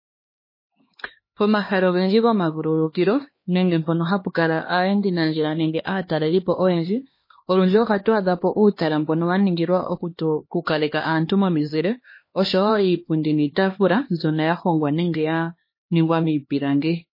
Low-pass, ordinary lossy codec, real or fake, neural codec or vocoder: 5.4 kHz; MP3, 24 kbps; fake; codec, 16 kHz, 2 kbps, X-Codec, HuBERT features, trained on LibriSpeech